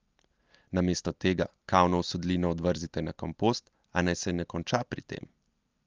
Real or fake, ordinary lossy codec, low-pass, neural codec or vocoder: real; Opus, 32 kbps; 7.2 kHz; none